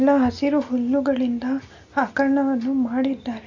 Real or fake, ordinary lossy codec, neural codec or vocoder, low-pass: real; AAC, 48 kbps; none; 7.2 kHz